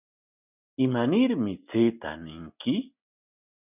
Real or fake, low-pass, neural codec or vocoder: real; 3.6 kHz; none